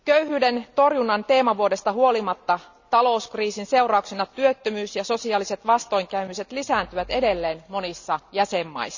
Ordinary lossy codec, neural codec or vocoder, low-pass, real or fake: none; none; 7.2 kHz; real